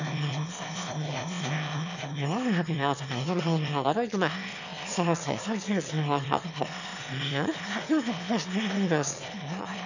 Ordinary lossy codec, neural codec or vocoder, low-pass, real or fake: none; autoencoder, 22.05 kHz, a latent of 192 numbers a frame, VITS, trained on one speaker; 7.2 kHz; fake